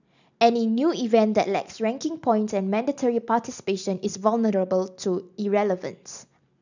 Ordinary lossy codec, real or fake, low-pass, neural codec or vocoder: none; real; 7.2 kHz; none